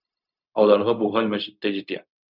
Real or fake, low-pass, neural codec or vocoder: fake; 5.4 kHz; codec, 16 kHz, 0.4 kbps, LongCat-Audio-Codec